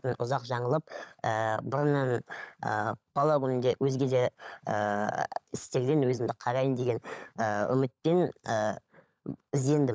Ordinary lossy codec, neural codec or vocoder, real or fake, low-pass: none; codec, 16 kHz, 16 kbps, FunCodec, trained on LibriTTS, 50 frames a second; fake; none